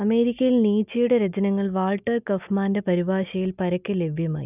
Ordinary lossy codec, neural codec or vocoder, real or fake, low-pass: none; none; real; 3.6 kHz